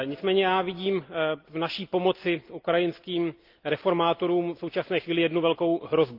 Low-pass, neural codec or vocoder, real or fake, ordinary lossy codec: 5.4 kHz; none; real; Opus, 32 kbps